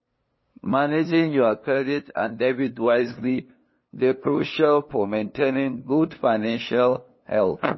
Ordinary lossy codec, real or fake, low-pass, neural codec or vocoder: MP3, 24 kbps; fake; 7.2 kHz; codec, 16 kHz, 2 kbps, FunCodec, trained on LibriTTS, 25 frames a second